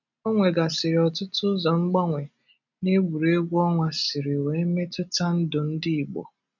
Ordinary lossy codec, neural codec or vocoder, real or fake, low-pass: none; none; real; 7.2 kHz